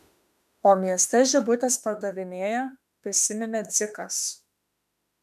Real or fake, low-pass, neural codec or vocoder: fake; 14.4 kHz; autoencoder, 48 kHz, 32 numbers a frame, DAC-VAE, trained on Japanese speech